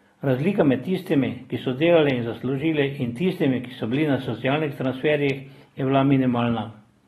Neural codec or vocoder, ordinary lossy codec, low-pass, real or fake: none; AAC, 32 kbps; 14.4 kHz; real